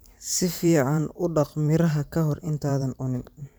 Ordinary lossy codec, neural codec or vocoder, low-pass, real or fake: none; vocoder, 44.1 kHz, 128 mel bands every 512 samples, BigVGAN v2; none; fake